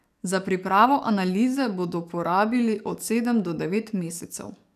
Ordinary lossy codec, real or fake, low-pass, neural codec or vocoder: none; fake; 14.4 kHz; codec, 44.1 kHz, 7.8 kbps, DAC